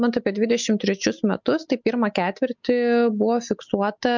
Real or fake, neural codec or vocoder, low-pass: real; none; 7.2 kHz